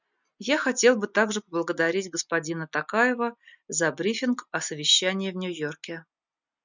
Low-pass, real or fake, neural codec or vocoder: 7.2 kHz; real; none